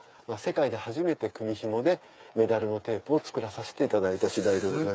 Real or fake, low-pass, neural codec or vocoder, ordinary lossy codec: fake; none; codec, 16 kHz, 8 kbps, FreqCodec, smaller model; none